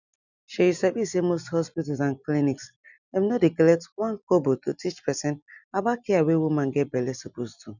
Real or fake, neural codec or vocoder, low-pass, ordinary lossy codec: real; none; 7.2 kHz; none